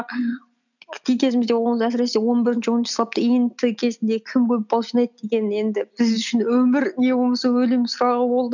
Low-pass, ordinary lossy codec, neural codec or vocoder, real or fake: 7.2 kHz; none; none; real